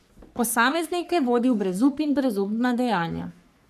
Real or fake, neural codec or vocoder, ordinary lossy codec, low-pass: fake; codec, 44.1 kHz, 3.4 kbps, Pupu-Codec; none; 14.4 kHz